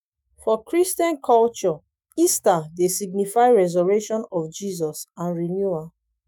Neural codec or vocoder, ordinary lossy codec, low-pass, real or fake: autoencoder, 48 kHz, 128 numbers a frame, DAC-VAE, trained on Japanese speech; none; none; fake